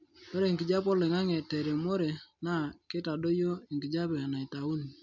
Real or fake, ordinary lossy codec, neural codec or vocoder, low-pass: real; MP3, 64 kbps; none; 7.2 kHz